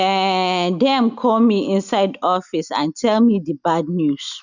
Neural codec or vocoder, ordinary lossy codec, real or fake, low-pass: none; none; real; 7.2 kHz